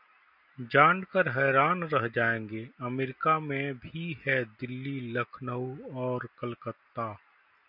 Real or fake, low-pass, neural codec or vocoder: real; 5.4 kHz; none